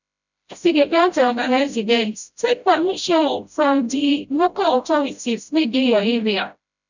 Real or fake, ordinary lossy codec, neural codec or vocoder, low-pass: fake; none; codec, 16 kHz, 0.5 kbps, FreqCodec, smaller model; 7.2 kHz